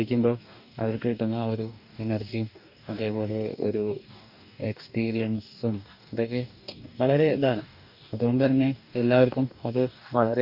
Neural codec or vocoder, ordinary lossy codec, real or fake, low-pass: codec, 44.1 kHz, 2.6 kbps, DAC; none; fake; 5.4 kHz